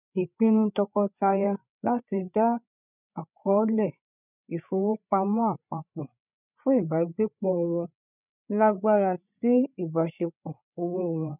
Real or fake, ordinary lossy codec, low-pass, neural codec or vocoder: fake; none; 3.6 kHz; codec, 16 kHz, 8 kbps, FreqCodec, larger model